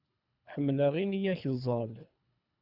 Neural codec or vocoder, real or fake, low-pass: codec, 24 kHz, 3 kbps, HILCodec; fake; 5.4 kHz